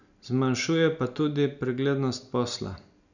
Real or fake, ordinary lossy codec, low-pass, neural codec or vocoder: real; none; 7.2 kHz; none